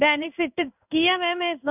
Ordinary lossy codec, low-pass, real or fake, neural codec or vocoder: none; 3.6 kHz; fake; codec, 16 kHz in and 24 kHz out, 1 kbps, XY-Tokenizer